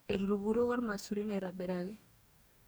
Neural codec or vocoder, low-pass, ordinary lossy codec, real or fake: codec, 44.1 kHz, 2.6 kbps, DAC; none; none; fake